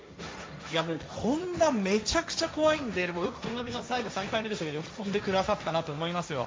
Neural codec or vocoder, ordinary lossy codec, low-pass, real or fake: codec, 16 kHz, 1.1 kbps, Voila-Tokenizer; none; none; fake